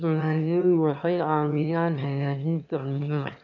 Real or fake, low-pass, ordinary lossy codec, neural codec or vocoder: fake; 7.2 kHz; none; autoencoder, 22.05 kHz, a latent of 192 numbers a frame, VITS, trained on one speaker